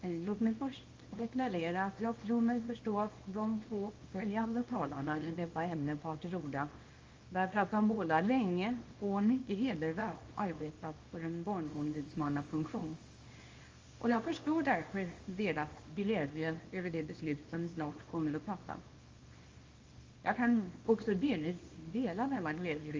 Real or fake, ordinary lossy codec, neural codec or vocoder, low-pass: fake; Opus, 32 kbps; codec, 24 kHz, 0.9 kbps, WavTokenizer, small release; 7.2 kHz